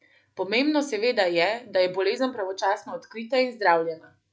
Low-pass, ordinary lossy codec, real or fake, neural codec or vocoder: none; none; real; none